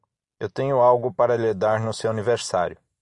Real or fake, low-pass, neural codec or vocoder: real; 10.8 kHz; none